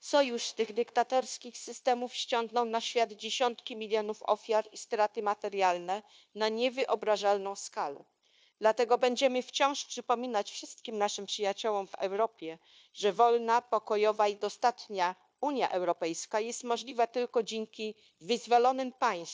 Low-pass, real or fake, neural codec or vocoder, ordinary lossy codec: none; fake; codec, 16 kHz, 0.9 kbps, LongCat-Audio-Codec; none